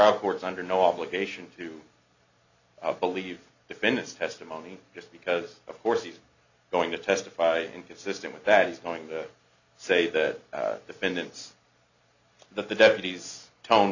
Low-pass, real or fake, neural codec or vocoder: 7.2 kHz; real; none